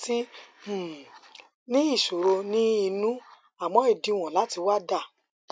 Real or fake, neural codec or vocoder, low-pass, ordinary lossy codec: real; none; none; none